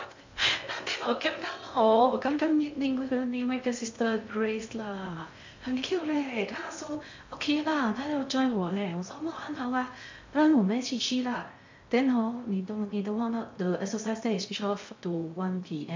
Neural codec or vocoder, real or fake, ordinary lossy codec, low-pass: codec, 16 kHz in and 24 kHz out, 0.6 kbps, FocalCodec, streaming, 2048 codes; fake; MP3, 64 kbps; 7.2 kHz